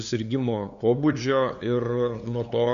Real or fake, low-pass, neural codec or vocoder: fake; 7.2 kHz; codec, 16 kHz, 2 kbps, FunCodec, trained on LibriTTS, 25 frames a second